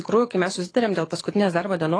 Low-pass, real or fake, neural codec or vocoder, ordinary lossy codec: 9.9 kHz; fake; codec, 24 kHz, 6 kbps, HILCodec; AAC, 32 kbps